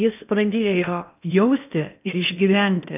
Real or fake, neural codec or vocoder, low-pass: fake; codec, 16 kHz in and 24 kHz out, 0.8 kbps, FocalCodec, streaming, 65536 codes; 3.6 kHz